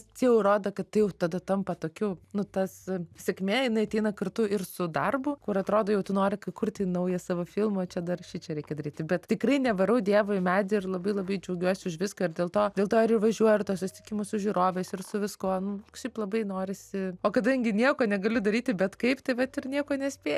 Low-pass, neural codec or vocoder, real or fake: 14.4 kHz; none; real